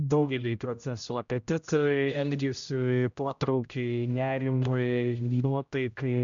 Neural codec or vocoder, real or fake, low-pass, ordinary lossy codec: codec, 16 kHz, 0.5 kbps, X-Codec, HuBERT features, trained on general audio; fake; 7.2 kHz; MP3, 96 kbps